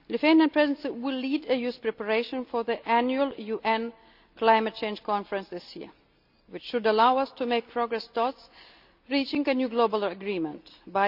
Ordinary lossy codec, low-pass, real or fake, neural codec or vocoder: none; 5.4 kHz; real; none